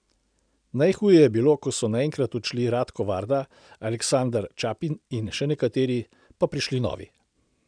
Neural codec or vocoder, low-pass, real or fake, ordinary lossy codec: none; 9.9 kHz; real; none